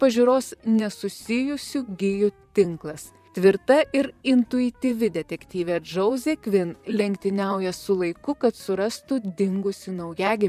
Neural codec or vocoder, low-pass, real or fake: vocoder, 44.1 kHz, 128 mel bands, Pupu-Vocoder; 14.4 kHz; fake